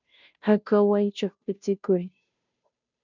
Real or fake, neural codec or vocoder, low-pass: fake; codec, 16 kHz, 0.5 kbps, FunCodec, trained on Chinese and English, 25 frames a second; 7.2 kHz